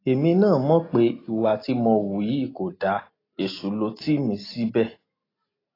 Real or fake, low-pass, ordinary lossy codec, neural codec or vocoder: real; 5.4 kHz; AAC, 24 kbps; none